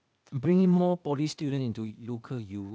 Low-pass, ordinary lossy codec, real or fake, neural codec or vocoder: none; none; fake; codec, 16 kHz, 0.8 kbps, ZipCodec